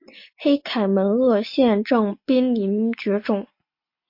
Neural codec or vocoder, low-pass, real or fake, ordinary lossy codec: none; 5.4 kHz; real; MP3, 32 kbps